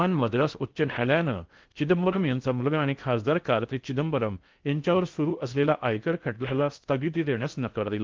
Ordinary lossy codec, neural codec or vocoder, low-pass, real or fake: Opus, 32 kbps; codec, 16 kHz in and 24 kHz out, 0.6 kbps, FocalCodec, streaming, 4096 codes; 7.2 kHz; fake